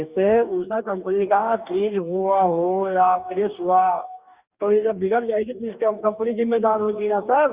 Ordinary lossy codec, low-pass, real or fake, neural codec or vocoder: Opus, 64 kbps; 3.6 kHz; fake; codec, 44.1 kHz, 2.6 kbps, DAC